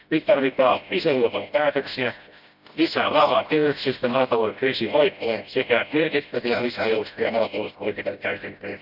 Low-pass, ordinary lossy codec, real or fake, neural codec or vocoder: 5.4 kHz; none; fake; codec, 16 kHz, 0.5 kbps, FreqCodec, smaller model